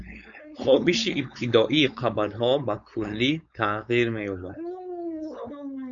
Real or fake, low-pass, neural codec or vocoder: fake; 7.2 kHz; codec, 16 kHz, 4.8 kbps, FACodec